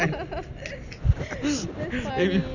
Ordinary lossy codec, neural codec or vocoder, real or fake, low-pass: none; none; real; 7.2 kHz